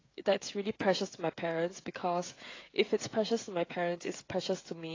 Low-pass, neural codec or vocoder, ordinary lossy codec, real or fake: 7.2 kHz; codec, 16 kHz, 16 kbps, FreqCodec, smaller model; AAC, 32 kbps; fake